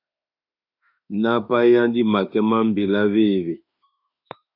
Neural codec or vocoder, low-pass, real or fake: autoencoder, 48 kHz, 32 numbers a frame, DAC-VAE, trained on Japanese speech; 5.4 kHz; fake